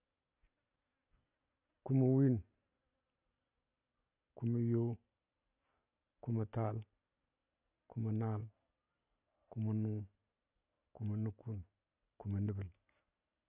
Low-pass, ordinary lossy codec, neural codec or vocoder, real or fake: 3.6 kHz; none; none; real